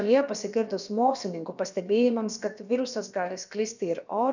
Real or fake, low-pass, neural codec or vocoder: fake; 7.2 kHz; codec, 16 kHz, about 1 kbps, DyCAST, with the encoder's durations